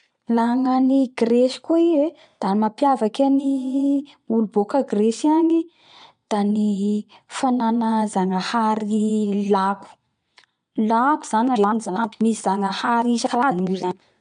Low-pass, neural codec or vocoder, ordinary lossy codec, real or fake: 9.9 kHz; vocoder, 22.05 kHz, 80 mel bands, Vocos; MP3, 64 kbps; fake